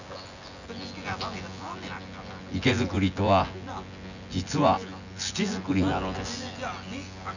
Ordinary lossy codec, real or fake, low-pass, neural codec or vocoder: none; fake; 7.2 kHz; vocoder, 24 kHz, 100 mel bands, Vocos